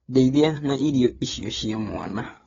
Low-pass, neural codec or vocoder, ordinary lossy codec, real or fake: 7.2 kHz; codec, 16 kHz, 4 kbps, FreqCodec, larger model; AAC, 24 kbps; fake